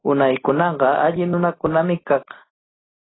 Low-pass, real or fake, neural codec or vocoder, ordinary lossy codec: 7.2 kHz; real; none; AAC, 16 kbps